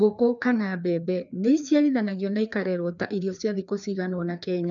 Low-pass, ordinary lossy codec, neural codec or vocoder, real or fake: 7.2 kHz; none; codec, 16 kHz, 2 kbps, FreqCodec, larger model; fake